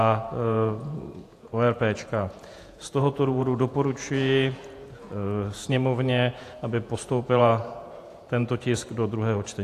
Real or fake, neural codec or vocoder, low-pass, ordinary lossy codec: fake; vocoder, 48 kHz, 128 mel bands, Vocos; 14.4 kHz; AAC, 64 kbps